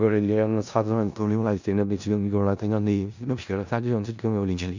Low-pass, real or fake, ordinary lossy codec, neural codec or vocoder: 7.2 kHz; fake; none; codec, 16 kHz in and 24 kHz out, 0.4 kbps, LongCat-Audio-Codec, four codebook decoder